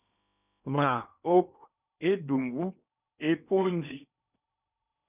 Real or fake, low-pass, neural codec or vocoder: fake; 3.6 kHz; codec, 16 kHz in and 24 kHz out, 0.8 kbps, FocalCodec, streaming, 65536 codes